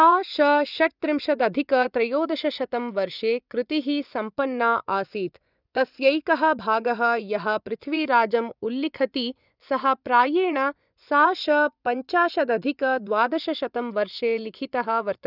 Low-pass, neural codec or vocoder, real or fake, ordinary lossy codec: 5.4 kHz; vocoder, 24 kHz, 100 mel bands, Vocos; fake; none